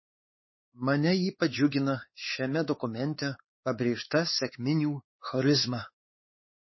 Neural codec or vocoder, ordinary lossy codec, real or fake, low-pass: codec, 16 kHz, 4 kbps, X-Codec, WavLM features, trained on Multilingual LibriSpeech; MP3, 24 kbps; fake; 7.2 kHz